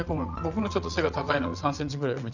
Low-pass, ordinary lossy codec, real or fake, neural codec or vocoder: 7.2 kHz; none; fake; vocoder, 22.05 kHz, 80 mel bands, WaveNeXt